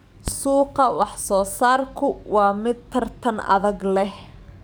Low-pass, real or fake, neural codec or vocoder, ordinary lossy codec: none; fake; codec, 44.1 kHz, 7.8 kbps, DAC; none